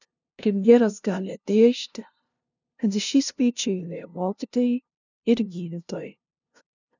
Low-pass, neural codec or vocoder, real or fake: 7.2 kHz; codec, 16 kHz, 0.5 kbps, FunCodec, trained on LibriTTS, 25 frames a second; fake